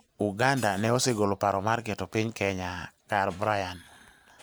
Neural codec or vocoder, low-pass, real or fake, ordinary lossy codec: none; none; real; none